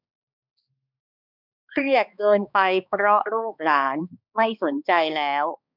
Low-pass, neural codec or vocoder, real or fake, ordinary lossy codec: 5.4 kHz; codec, 16 kHz, 2 kbps, X-Codec, HuBERT features, trained on balanced general audio; fake; none